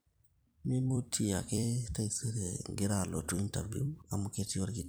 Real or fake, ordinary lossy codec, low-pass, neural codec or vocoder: fake; none; none; vocoder, 44.1 kHz, 128 mel bands every 256 samples, BigVGAN v2